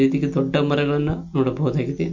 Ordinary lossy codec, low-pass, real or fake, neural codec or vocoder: MP3, 48 kbps; 7.2 kHz; real; none